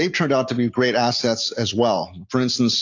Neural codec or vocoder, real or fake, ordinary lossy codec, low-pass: none; real; AAC, 48 kbps; 7.2 kHz